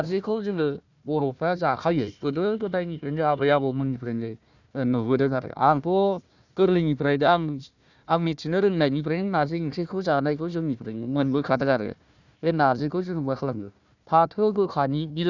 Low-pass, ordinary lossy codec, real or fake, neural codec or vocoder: 7.2 kHz; none; fake; codec, 16 kHz, 1 kbps, FunCodec, trained on Chinese and English, 50 frames a second